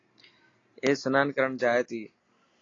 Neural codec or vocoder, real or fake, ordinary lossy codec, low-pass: none; real; AAC, 32 kbps; 7.2 kHz